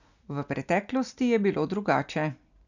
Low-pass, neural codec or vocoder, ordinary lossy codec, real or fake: 7.2 kHz; none; none; real